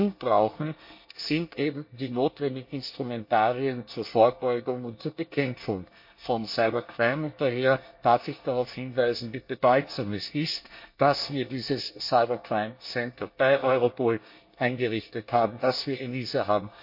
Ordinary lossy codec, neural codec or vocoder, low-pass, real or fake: MP3, 32 kbps; codec, 24 kHz, 1 kbps, SNAC; 5.4 kHz; fake